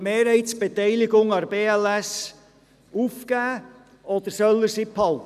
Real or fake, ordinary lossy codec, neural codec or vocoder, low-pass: real; none; none; 14.4 kHz